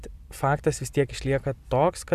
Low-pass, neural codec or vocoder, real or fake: 14.4 kHz; none; real